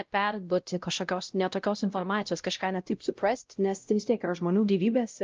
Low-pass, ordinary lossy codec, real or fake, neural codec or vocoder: 7.2 kHz; Opus, 24 kbps; fake; codec, 16 kHz, 0.5 kbps, X-Codec, WavLM features, trained on Multilingual LibriSpeech